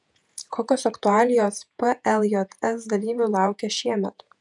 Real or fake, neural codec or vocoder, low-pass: fake; vocoder, 48 kHz, 128 mel bands, Vocos; 10.8 kHz